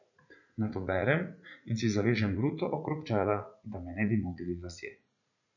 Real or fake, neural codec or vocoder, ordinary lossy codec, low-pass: fake; vocoder, 44.1 kHz, 80 mel bands, Vocos; none; 7.2 kHz